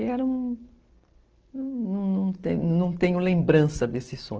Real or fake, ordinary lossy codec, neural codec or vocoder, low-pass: real; Opus, 16 kbps; none; 7.2 kHz